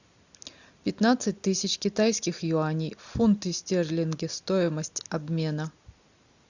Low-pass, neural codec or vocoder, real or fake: 7.2 kHz; none; real